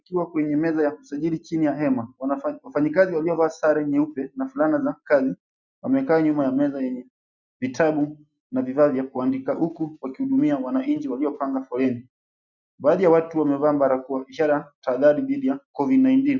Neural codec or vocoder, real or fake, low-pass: none; real; 7.2 kHz